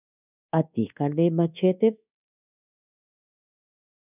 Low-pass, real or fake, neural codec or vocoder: 3.6 kHz; fake; codec, 24 kHz, 1.2 kbps, DualCodec